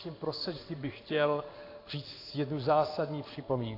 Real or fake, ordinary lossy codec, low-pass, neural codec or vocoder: fake; AAC, 32 kbps; 5.4 kHz; codec, 16 kHz in and 24 kHz out, 1 kbps, XY-Tokenizer